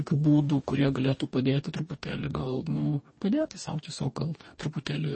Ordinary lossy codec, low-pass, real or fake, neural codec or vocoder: MP3, 32 kbps; 9.9 kHz; fake; codec, 44.1 kHz, 2.6 kbps, DAC